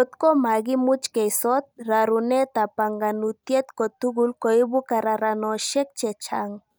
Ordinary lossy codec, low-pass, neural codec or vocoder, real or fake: none; none; none; real